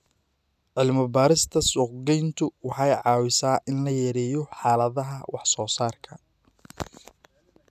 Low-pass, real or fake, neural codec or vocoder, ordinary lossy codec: 14.4 kHz; real; none; none